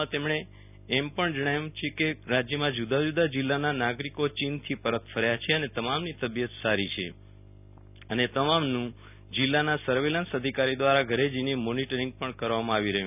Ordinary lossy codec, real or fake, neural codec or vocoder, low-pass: none; real; none; 3.6 kHz